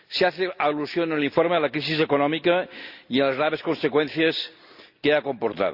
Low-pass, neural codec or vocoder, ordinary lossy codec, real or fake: 5.4 kHz; none; Opus, 64 kbps; real